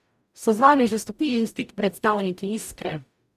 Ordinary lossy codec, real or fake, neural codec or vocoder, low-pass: none; fake; codec, 44.1 kHz, 0.9 kbps, DAC; 14.4 kHz